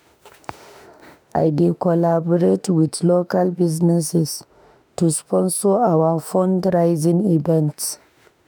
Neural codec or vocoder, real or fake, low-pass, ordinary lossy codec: autoencoder, 48 kHz, 32 numbers a frame, DAC-VAE, trained on Japanese speech; fake; none; none